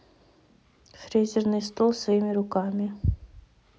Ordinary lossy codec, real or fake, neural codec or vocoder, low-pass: none; real; none; none